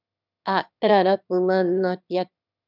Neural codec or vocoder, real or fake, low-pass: autoencoder, 22.05 kHz, a latent of 192 numbers a frame, VITS, trained on one speaker; fake; 5.4 kHz